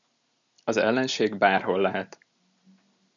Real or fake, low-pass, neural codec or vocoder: real; 7.2 kHz; none